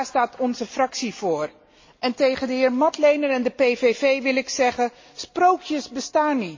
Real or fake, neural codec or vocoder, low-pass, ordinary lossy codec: real; none; 7.2 kHz; MP3, 32 kbps